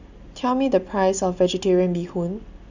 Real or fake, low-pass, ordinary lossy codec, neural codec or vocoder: real; 7.2 kHz; none; none